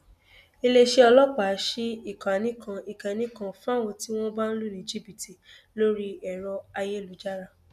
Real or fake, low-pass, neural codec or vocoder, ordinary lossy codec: real; 14.4 kHz; none; none